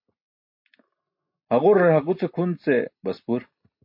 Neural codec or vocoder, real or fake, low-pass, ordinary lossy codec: none; real; 5.4 kHz; MP3, 32 kbps